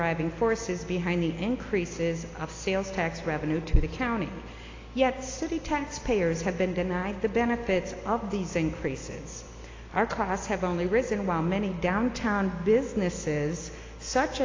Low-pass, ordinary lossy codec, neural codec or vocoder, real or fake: 7.2 kHz; AAC, 32 kbps; none; real